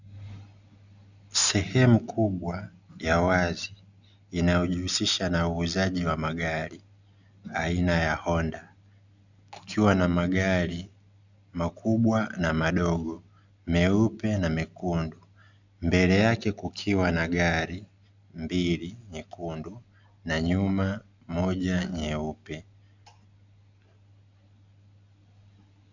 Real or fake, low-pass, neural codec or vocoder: real; 7.2 kHz; none